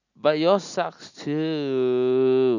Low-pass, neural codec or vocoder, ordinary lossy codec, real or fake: 7.2 kHz; none; none; real